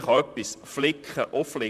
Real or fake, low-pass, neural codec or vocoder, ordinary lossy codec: fake; 14.4 kHz; vocoder, 44.1 kHz, 128 mel bands, Pupu-Vocoder; none